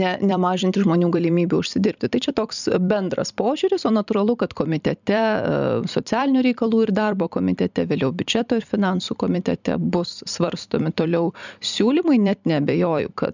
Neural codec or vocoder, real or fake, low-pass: vocoder, 44.1 kHz, 128 mel bands every 256 samples, BigVGAN v2; fake; 7.2 kHz